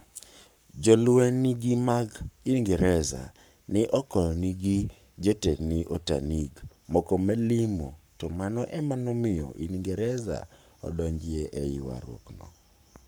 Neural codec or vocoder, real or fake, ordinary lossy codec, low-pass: codec, 44.1 kHz, 7.8 kbps, Pupu-Codec; fake; none; none